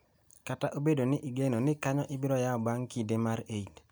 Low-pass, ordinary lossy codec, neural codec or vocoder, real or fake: none; none; none; real